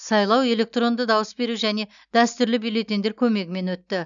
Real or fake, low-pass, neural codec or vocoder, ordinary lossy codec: real; 7.2 kHz; none; none